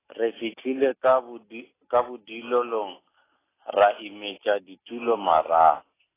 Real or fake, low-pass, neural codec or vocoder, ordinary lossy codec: real; 3.6 kHz; none; AAC, 16 kbps